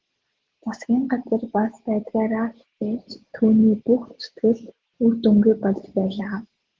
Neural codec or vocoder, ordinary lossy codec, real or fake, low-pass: none; Opus, 16 kbps; real; 7.2 kHz